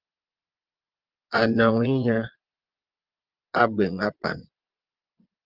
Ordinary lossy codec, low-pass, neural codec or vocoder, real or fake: Opus, 24 kbps; 5.4 kHz; vocoder, 22.05 kHz, 80 mel bands, Vocos; fake